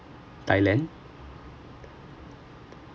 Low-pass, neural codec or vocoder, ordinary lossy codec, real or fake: none; none; none; real